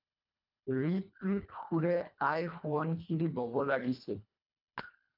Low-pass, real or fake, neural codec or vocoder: 5.4 kHz; fake; codec, 24 kHz, 1.5 kbps, HILCodec